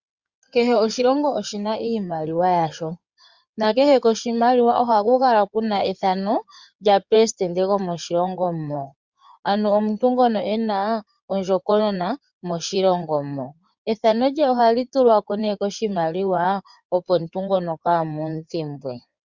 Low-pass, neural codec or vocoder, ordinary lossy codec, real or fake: 7.2 kHz; codec, 16 kHz in and 24 kHz out, 2.2 kbps, FireRedTTS-2 codec; Opus, 64 kbps; fake